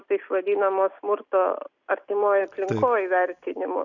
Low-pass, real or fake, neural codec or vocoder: 7.2 kHz; real; none